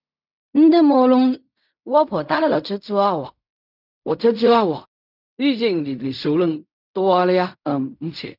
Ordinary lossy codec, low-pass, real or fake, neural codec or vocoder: none; 5.4 kHz; fake; codec, 16 kHz in and 24 kHz out, 0.4 kbps, LongCat-Audio-Codec, fine tuned four codebook decoder